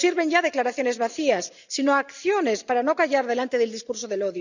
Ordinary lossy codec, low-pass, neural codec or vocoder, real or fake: none; 7.2 kHz; none; real